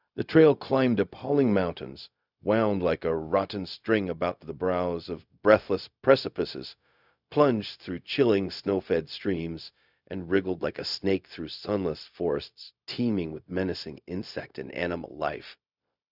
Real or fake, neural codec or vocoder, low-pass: fake; codec, 16 kHz, 0.4 kbps, LongCat-Audio-Codec; 5.4 kHz